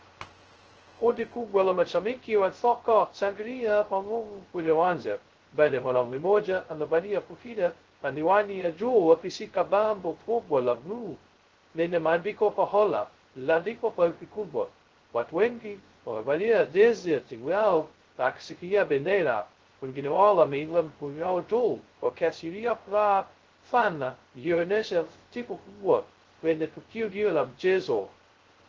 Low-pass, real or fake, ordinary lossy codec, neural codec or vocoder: 7.2 kHz; fake; Opus, 16 kbps; codec, 16 kHz, 0.2 kbps, FocalCodec